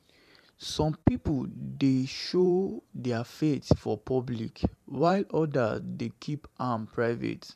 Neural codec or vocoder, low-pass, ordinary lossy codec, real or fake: vocoder, 44.1 kHz, 128 mel bands every 512 samples, BigVGAN v2; 14.4 kHz; none; fake